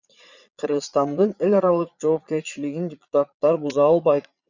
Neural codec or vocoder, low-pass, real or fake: vocoder, 22.05 kHz, 80 mel bands, Vocos; 7.2 kHz; fake